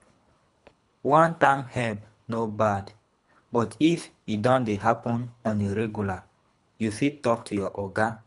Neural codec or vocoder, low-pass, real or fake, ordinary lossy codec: codec, 24 kHz, 3 kbps, HILCodec; 10.8 kHz; fake; none